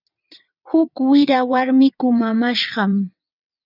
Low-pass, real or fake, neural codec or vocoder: 5.4 kHz; fake; vocoder, 22.05 kHz, 80 mel bands, WaveNeXt